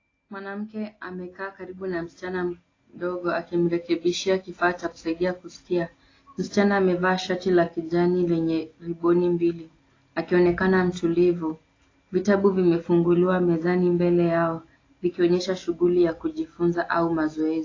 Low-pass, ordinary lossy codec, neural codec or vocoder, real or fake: 7.2 kHz; AAC, 32 kbps; none; real